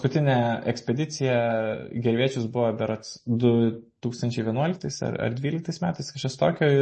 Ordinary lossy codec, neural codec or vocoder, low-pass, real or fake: MP3, 32 kbps; none; 10.8 kHz; real